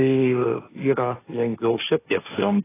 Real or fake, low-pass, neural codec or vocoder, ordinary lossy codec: fake; 3.6 kHz; codec, 16 kHz, 1.1 kbps, Voila-Tokenizer; AAC, 16 kbps